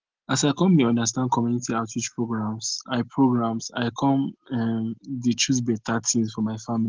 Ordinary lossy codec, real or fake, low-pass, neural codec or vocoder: Opus, 16 kbps; real; 7.2 kHz; none